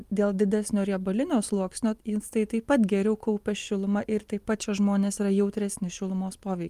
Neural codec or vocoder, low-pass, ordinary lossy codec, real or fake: none; 14.4 kHz; Opus, 32 kbps; real